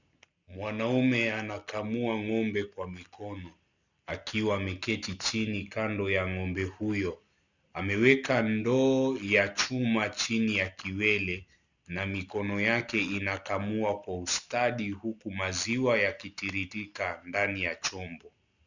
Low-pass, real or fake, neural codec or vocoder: 7.2 kHz; real; none